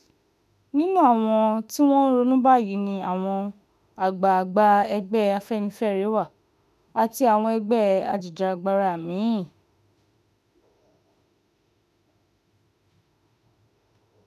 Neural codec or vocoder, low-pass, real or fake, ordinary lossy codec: autoencoder, 48 kHz, 32 numbers a frame, DAC-VAE, trained on Japanese speech; 14.4 kHz; fake; none